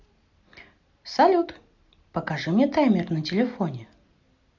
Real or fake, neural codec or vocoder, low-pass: real; none; 7.2 kHz